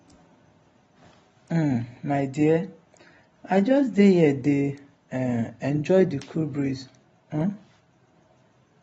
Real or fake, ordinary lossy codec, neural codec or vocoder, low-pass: real; AAC, 24 kbps; none; 10.8 kHz